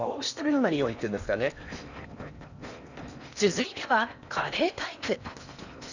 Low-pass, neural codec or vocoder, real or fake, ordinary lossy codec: 7.2 kHz; codec, 16 kHz in and 24 kHz out, 0.8 kbps, FocalCodec, streaming, 65536 codes; fake; none